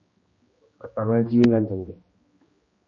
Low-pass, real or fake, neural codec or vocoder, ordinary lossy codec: 7.2 kHz; fake; codec, 16 kHz, 1 kbps, X-Codec, HuBERT features, trained on general audio; MP3, 32 kbps